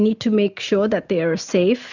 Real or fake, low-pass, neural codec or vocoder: real; 7.2 kHz; none